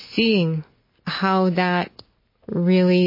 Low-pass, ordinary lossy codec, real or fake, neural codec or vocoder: 5.4 kHz; MP3, 24 kbps; real; none